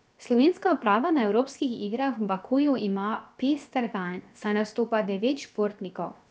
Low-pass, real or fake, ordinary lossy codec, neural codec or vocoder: none; fake; none; codec, 16 kHz, 0.7 kbps, FocalCodec